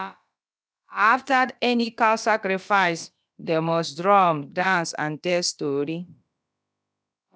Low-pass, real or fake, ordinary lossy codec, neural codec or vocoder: none; fake; none; codec, 16 kHz, about 1 kbps, DyCAST, with the encoder's durations